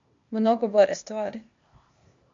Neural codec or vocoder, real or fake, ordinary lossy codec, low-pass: codec, 16 kHz, 0.8 kbps, ZipCodec; fake; MP3, 48 kbps; 7.2 kHz